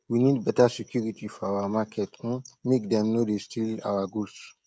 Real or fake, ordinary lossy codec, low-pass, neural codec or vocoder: fake; none; none; codec, 16 kHz, 16 kbps, FreqCodec, smaller model